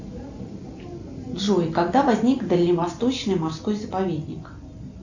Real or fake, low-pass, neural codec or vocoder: real; 7.2 kHz; none